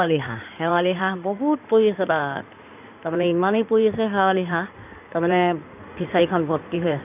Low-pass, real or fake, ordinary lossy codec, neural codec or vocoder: 3.6 kHz; fake; none; codec, 16 kHz in and 24 kHz out, 2.2 kbps, FireRedTTS-2 codec